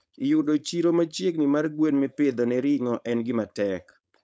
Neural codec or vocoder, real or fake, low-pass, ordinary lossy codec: codec, 16 kHz, 4.8 kbps, FACodec; fake; none; none